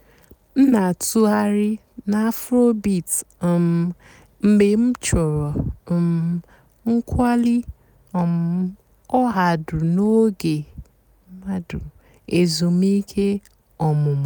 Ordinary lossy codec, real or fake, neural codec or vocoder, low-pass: none; real; none; none